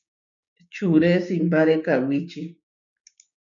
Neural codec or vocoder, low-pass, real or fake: codec, 16 kHz, 6 kbps, DAC; 7.2 kHz; fake